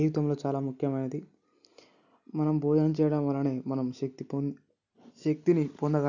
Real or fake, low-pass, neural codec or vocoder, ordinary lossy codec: real; 7.2 kHz; none; none